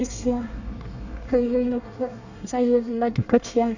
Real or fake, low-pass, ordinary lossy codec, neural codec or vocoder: fake; 7.2 kHz; none; codec, 24 kHz, 1 kbps, SNAC